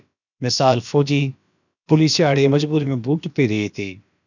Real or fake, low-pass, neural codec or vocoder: fake; 7.2 kHz; codec, 16 kHz, about 1 kbps, DyCAST, with the encoder's durations